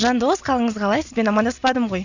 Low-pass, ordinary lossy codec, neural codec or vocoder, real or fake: 7.2 kHz; none; none; real